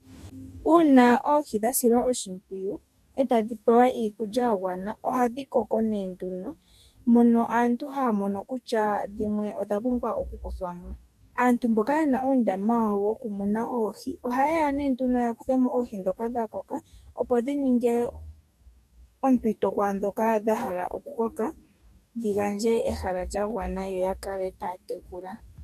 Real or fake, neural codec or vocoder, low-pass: fake; codec, 44.1 kHz, 2.6 kbps, DAC; 14.4 kHz